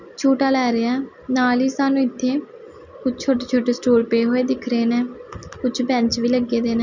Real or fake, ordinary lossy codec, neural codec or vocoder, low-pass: real; none; none; 7.2 kHz